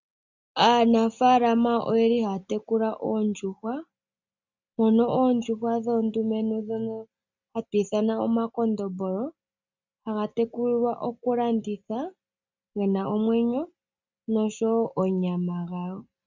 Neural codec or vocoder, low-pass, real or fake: none; 7.2 kHz; real